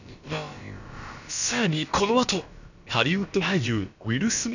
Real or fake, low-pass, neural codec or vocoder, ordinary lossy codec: fake; 7.2 kHz; codec, 16 kHz, about 1 kbps, DyCAST, with the encoder's durations; none